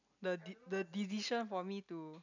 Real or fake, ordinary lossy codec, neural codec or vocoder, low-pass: real; none; none; 7.2 kHz